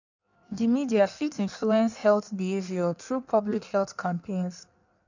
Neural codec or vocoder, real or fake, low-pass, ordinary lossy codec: codec, 16 kHz in and 24 kHz out, 1.1 kbps, FireRedTTS-2 codec; fake; 7.2 kHz; none